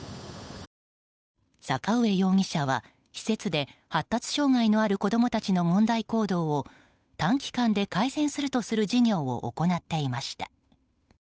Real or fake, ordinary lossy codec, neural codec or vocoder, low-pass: fake; none; codec, 16 kHz, 8 kbps, FunCodec, trained on Chinese and English, 25 frames a second; none